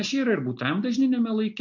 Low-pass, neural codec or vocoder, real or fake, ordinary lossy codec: 7.2 kHz; none; real; MP3, 48 kbps